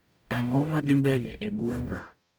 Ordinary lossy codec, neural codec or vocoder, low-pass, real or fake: none; codec, 44.1 kHz, 0.9 kbps, DAC; none; fake